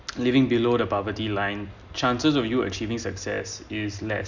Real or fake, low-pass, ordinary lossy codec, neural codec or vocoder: real; 7.2 kHz; none; none